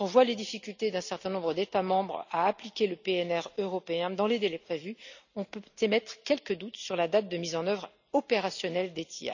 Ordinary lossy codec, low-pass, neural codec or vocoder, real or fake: none; 7.2 kHz; none; real